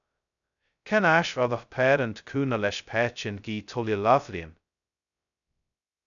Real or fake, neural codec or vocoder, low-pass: fake; codec, 16 kHz, 0.2 kbps, FocalCodec; 7.2 kHz